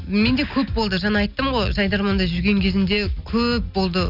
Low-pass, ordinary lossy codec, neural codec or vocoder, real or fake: 5.4 kHz; none; none; real